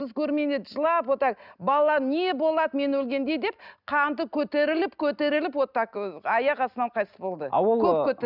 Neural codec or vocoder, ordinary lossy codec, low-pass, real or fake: none; none; 5.4 kHz; real